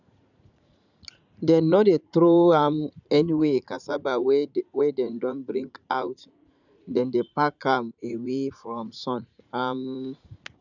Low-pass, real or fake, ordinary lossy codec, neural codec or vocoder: 7.2 kHz; fake; none; vocoder, 44.1 kHz, 80 mel bands, Vocos